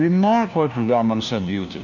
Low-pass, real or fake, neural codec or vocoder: 7.2 kHz; fake; codec, 16 kHz, 1 kbps, FunCodec, trained on LibriTTS, 50 frames a second